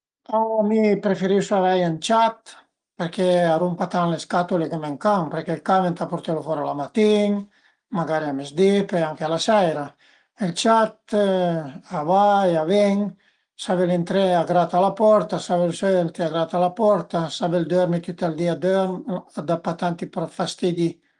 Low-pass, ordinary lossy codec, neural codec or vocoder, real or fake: 9.9 kHz; Opus, 24 kbps; none; real